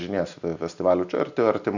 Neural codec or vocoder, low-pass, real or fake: none; 7.2 kHz; real